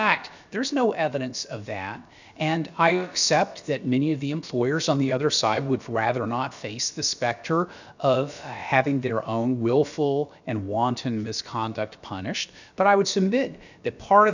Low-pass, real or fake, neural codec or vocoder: 7.2 kHz; fake; codec, 16 kHz, about 1 kbps, DyCAST, with the encoder's durations